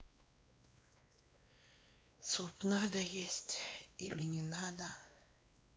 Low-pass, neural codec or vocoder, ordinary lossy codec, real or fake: none; codec, 16 kHz, 2 kbps, X-Codec, WavLM features, trained on Multilingual LibriSpeech; none; fake